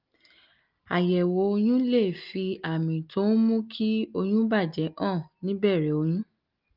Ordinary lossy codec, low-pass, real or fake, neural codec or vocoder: Opus, 24 kbps; 5.4 kHz; real; none